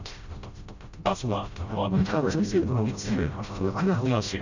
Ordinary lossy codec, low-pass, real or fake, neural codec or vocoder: Opus, 64 kbps; 7.2 kHz; fake; codec, 16 kHz, 0.5 kbps, FreqCodec, smaller model